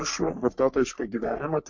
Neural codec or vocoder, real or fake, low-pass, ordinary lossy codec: codec, 44.1 kHz, 3.4 kbps, Pupu-Codec; fake; 7.2 kHz; MP3, 48 kbps